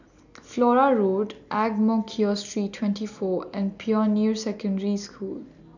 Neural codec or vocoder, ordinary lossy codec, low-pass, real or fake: none; none; 7.2 kHz; real